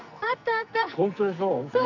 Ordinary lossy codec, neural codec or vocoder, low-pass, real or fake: none; codec, 44.1 kHz, 2.6 kbps, SNAC; 7.2 kHz; fake